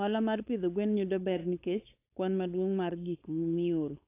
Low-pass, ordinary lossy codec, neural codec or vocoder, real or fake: 3.6 kHz; AAC, 24 kbps; codec, 16 kHz, 4.8 kbps, FACodec; fake